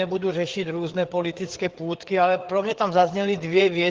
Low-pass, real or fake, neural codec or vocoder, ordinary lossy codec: 7.2 kHz; fake; codec, 16 kHz, 4 kbps, FreqCodec, larger model; Opus, 16 kbps